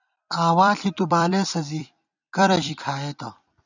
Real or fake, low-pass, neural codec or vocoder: fake; 7.2 kHz; vocoder, 44.1 kHz, 128 mel bands every 256 samples, BigVGAN v2